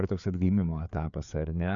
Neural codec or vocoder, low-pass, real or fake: codec, 16 kHz, 4 kbps, FreqCodec, larger model; 7.2 kHz; fake